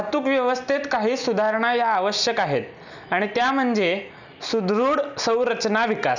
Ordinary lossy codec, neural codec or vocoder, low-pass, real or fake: none; none; 7.2 kHz; real